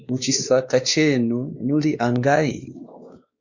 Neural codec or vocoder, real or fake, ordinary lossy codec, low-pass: codec, 16 kHz, 2 kbps, X-Codec, WavLM features, trained on Multilingual LibriSpeech; fake; Opus, 64 kbps; 7.2 kHz